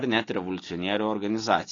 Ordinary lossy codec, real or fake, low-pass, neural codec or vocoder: AAC, 32 kbps; real; 7.2 kHz; none